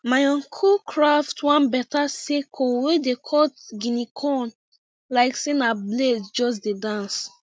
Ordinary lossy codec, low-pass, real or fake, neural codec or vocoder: none; none; real; none